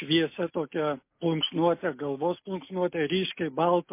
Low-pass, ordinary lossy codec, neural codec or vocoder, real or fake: 3.6 kHz; MP3, 24 kbps; none; real